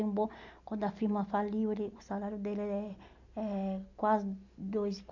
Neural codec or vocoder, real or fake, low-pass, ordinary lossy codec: none; real; 7.2 kHz; none